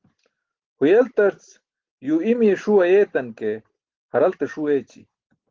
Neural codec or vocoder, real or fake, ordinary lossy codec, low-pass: none; real; Opus, 16 kbps; 7.2 kHz